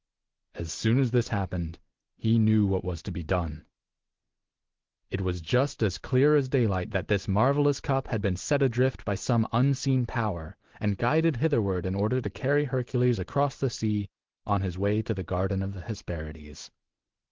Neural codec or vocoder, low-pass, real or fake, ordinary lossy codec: none; 7.2 kHz; real; Opus, 16 kbps